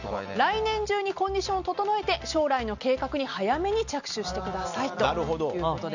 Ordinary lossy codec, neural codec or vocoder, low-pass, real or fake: none; none; 7.2 kHz; real